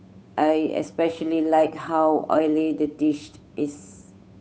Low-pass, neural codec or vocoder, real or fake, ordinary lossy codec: none; codec, 16 kHz, 8 kbps, FunCodec, trained on Chinese and English, 25 frames a second; fake; none